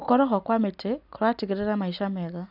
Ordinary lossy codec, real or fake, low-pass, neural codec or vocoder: none; real; 5.4 kHz; none